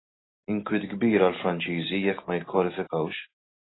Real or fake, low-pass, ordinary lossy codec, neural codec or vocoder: real; 7.2 kHz; AAC, 16 kbps; none